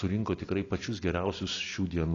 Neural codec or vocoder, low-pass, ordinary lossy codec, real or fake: none; 7.2 kHz; AAC, 32 kbps; real